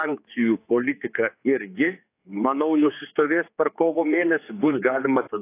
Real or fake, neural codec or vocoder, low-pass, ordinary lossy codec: fake; codec, 16 kHz, 4 kbps, X-Codec, HuBERT features, trained on general audio; 3.6 kHz; AAC, 24 kbps